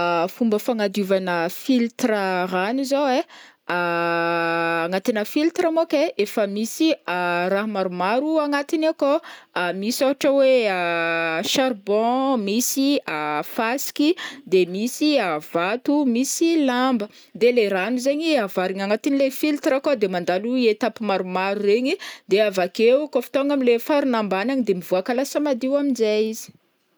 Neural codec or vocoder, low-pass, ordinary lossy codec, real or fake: none; none; none; real